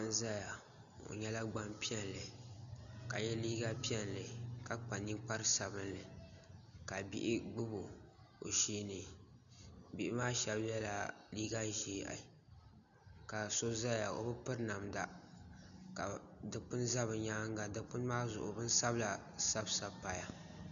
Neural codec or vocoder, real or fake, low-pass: none; real; 7.2 kHz